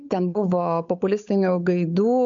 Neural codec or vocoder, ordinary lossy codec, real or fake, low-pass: codec, 16 kHz, 8 kbps, FunCodec, trained on Chinese and English, 25 frames a second; MP3, 64 kbps; fake; 7.2 kHz